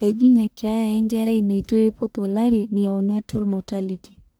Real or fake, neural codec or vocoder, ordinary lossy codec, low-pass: fake; codec, 44.1 kHz, 1.7 kbps, Pupu-Codec; none; none